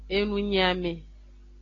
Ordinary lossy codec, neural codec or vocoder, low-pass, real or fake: AAC, 32 kbps; none; 7.2 kHz; real